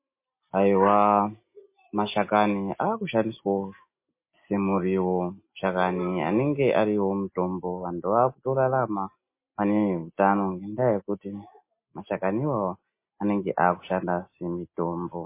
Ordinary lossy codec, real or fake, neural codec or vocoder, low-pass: MP3, 24 kbps; real; none; 3.6 kHz